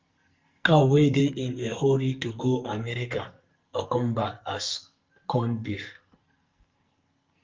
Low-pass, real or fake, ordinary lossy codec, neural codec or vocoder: 7.2 kHz; fake; Opus, 32 kbps; codec, 44.1 kHz, 2.6 kbps, SNAC